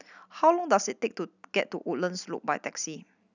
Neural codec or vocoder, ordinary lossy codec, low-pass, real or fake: none; none; 7.2 kHz; real